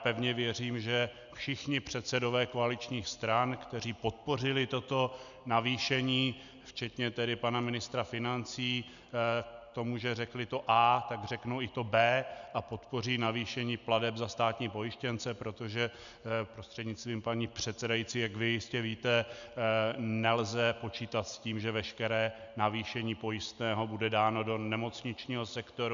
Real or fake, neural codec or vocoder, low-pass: real; none; 7.2 kHz